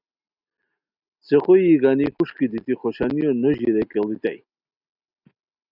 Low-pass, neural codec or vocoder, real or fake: 5.4 kHz; none; real